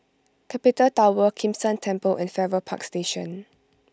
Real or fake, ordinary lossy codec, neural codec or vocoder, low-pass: real; none; none; none